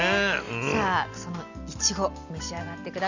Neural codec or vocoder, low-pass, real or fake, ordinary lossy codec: none; 7.2 kHz; real; none